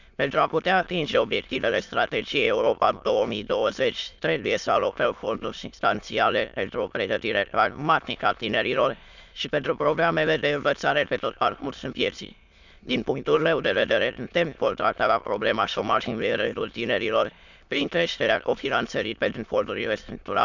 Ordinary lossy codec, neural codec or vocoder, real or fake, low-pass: none; autoencoder, 22.05 kHz, a latent of 192 numbers a frame, VITS, trained on many speakers; fake; 7.2 kHz